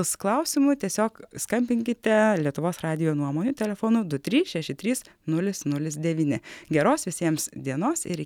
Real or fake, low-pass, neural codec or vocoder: real; 19.8 kHz; none